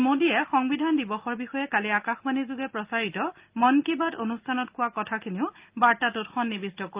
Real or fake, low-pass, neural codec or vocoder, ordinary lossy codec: real; 3.6 kHz; none; Opus, 24 kbps